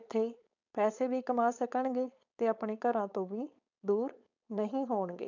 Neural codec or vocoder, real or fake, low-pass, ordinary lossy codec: codec, 16 kHz, 4.8 kbps, FACodec; fake; 7.2 kHz; none